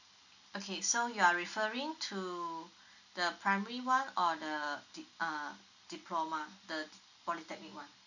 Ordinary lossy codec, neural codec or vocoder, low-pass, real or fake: none; none; 7.2 kHz; real